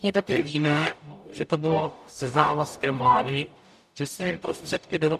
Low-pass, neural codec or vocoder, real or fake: 14.4 kHz; codec, 44.1 kHz, 0.9 kbps, DAC; fake